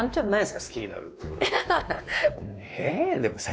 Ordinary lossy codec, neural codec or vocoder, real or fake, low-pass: none; codec, 16 kHz, 2 kbps, X-Codec, WavLM features, trained on Multilingual LibriSpeech; fake; none